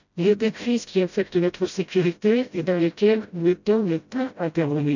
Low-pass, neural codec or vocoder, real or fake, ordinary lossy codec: 7.2 kHz; codec, 16 kHz, 0.5 kbps, FreqCodec, smaller model; fake; none